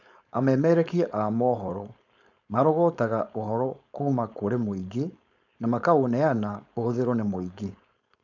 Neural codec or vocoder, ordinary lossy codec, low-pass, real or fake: codec, 16 kHz, 4.8 kbps, FACodec; none; 7.2 kHz; fake